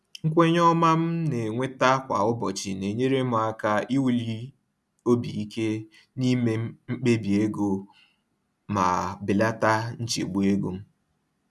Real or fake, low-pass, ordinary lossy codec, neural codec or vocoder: real; none; none; none